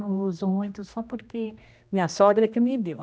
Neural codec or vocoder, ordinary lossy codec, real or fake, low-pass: codec, 16 kHz, 1 kbps, X-Codec, HuBERT features, trained on general audio; none; fake; none